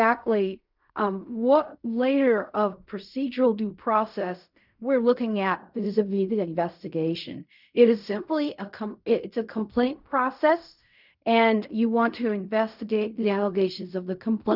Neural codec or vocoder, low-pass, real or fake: codec, 16 kHz in and 24 kHz out, 0.4 kbps, LongCat-Audio-Codec, fine tuned four codebook decoder; 5.4 kHz; fake